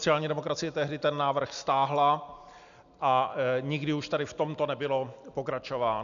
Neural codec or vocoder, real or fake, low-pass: none; real; 7.2 kHz